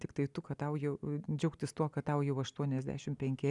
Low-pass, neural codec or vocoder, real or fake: 10.8 kHz; none; real